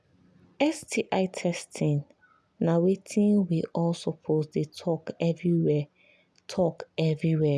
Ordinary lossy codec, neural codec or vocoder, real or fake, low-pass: none; none; real; none